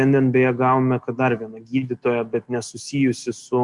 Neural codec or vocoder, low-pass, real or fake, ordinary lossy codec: none; 10.8 kHz; real; Opus, 64 kbps